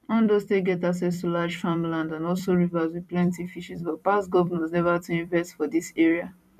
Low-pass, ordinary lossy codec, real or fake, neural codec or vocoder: 14.4 kHz; none; fake; vocoder, 44.1 kHz, 128 mel bands every 256 samples, BigVGAN v2